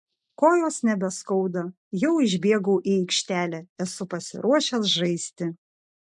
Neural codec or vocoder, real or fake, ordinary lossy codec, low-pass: none; real; MP3, 64 kbps; 10.8 kHz